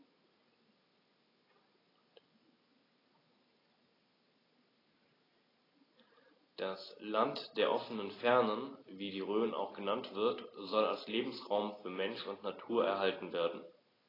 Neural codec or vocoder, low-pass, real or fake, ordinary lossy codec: none; 5.4 kHz; real; AAC, 24 kbps